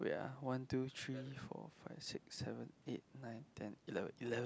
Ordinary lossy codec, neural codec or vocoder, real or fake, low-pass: none; none; real; none